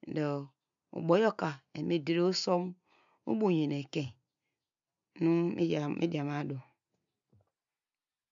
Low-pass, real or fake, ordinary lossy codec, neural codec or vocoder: 7.2 kHz; real; none; none